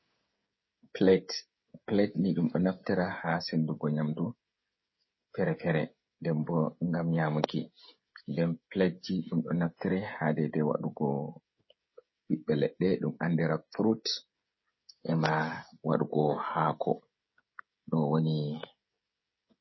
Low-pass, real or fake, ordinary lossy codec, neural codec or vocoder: 7.2 kHz; fake; MP3, 24 kbps; codec, 16 kHz, 16 kbps, FreqCodec, smaller model